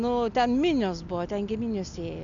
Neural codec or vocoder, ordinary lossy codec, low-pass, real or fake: none; AAC, 64 kbps; 7.2 kHz; real